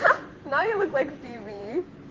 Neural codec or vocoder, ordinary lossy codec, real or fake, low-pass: vocoder, 44.1 kHz, 128 mel bands every 512 samples, BigVGAN v2; Opus, 16 kbps; fake; 7.2 kHz